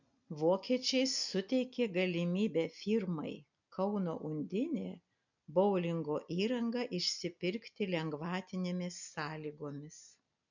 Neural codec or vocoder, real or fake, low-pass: none; real; 7.2 kHz